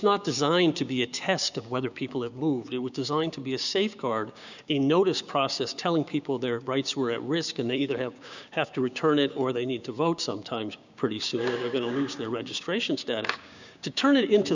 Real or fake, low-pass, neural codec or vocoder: fake; 7.2 kHz; codec, 16 kHz, 6 kbps, DAC